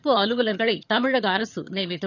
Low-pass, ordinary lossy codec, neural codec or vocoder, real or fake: 7.2 kHz; none; vocoder, 22.05 kHz, 80 mel bands, HiFi-GAN; fake